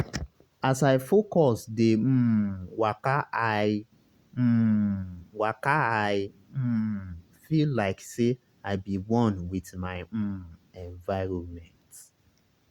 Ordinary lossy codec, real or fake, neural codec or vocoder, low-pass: Opus, 64 kbps; real; none; 19.8 kHz